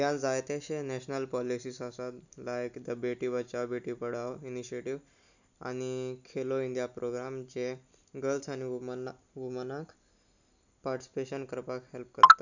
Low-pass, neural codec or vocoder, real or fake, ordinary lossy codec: 7.2 kHz; none; real; none